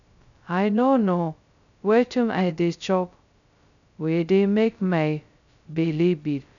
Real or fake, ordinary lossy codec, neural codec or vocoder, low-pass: fake; none; codec, 16 kHz, 0.2 kbps, FocalCodec; 7.2 kHz